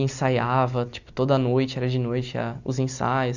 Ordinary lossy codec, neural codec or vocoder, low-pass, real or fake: none; none; 7.2 kHz; real